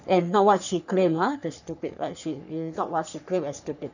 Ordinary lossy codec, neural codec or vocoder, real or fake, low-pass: none; codec, 44.1 kHz, 3.4 kbps, Pupu-Codec; fake; 7.2 kHz